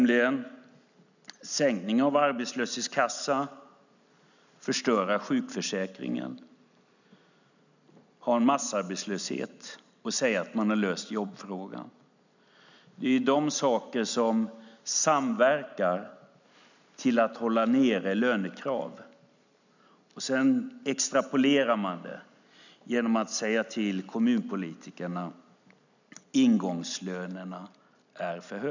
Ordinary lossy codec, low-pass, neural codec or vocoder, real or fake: none; 7.2 kHz; none; real